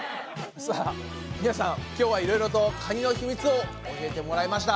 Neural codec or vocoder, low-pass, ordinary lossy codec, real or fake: none; none; none; real